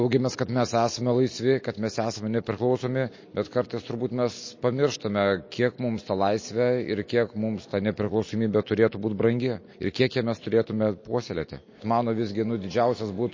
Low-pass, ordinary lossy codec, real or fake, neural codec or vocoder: 7.2 kHz; MP3, 32 kbps; real; none